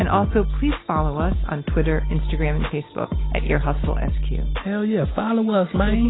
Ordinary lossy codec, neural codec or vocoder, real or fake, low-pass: AAC, 16 kbps; none; real; 7.2 kHz